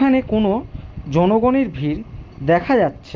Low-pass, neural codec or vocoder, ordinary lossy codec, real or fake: 7.2 kHz; none; Opus, 24 kbps; real